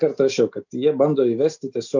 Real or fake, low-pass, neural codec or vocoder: real; 7.2 kHz; none